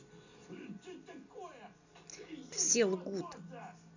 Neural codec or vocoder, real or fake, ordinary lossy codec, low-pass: none; real; none; 7.2 kHz